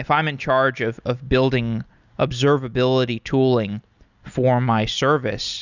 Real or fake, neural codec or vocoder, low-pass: real; none; 7.2 kHz